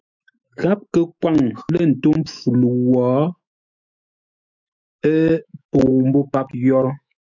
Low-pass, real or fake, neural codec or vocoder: 7.2 kHz; fake; autoencoder, 48 kHz, 128 numbers a frame, DAC-VAE, trained on Japanese speech